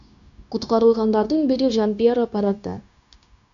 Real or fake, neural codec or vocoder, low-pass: fake; codec, 16 kHz, 0.9 kbps, LongCat-Audio-Codec; 7.2 kHz